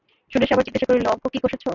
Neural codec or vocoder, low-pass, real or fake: none; 7.2 kHz; real